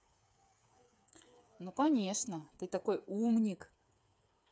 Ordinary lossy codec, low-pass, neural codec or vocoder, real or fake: none; none; codec, 16 kHz, 8 kbps, FreqCodec, smaller model; fake